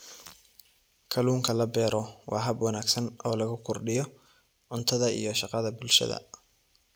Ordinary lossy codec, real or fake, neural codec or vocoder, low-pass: none; real; none; none